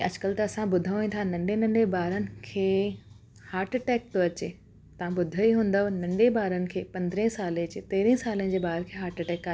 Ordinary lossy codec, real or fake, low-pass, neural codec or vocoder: none; real; none; none